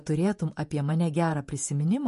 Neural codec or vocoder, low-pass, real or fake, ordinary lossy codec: vocoder, 48 kHz, 128 mel bands, Vocos; 14.4 kHz; fake; MP3, 48 kbps